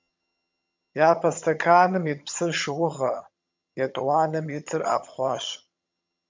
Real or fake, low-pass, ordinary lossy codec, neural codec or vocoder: fake; 7.2 kHz; MP3, 64 kbps; vocoder, 22.05 kHz, 80 mel bands, HiFi-GAN